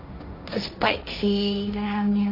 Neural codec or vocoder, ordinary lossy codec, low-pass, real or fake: codec, 16 kHz, 1.1 kbps, Voila-Tokenizer; none; 5.4 kHz; fake